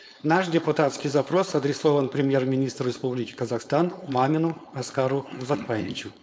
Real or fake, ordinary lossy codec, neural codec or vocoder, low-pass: fake; none; codec, 16 kHz, 4.8 kbps, FACodec; none